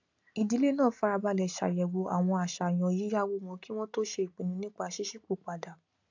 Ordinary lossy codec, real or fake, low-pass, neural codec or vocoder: AAC, 48 kbps; real; 7.2 kHz; none